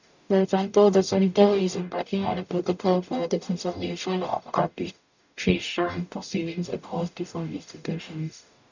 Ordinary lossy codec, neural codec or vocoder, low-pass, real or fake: none; codec, 44.1 kHz, 0.9 kbps, DAC; 7.2 kHz; fake